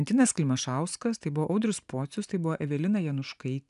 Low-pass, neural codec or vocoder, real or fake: 10.8 kHz; none; real